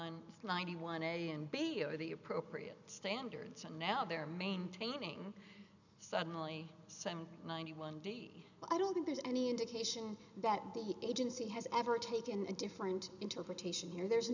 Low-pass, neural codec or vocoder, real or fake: 7.2 kHz; none; real